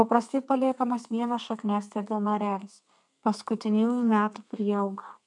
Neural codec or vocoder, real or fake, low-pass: codec, 32 kHz, 1.9 kbps, SNAC; fake; 10.8 kHz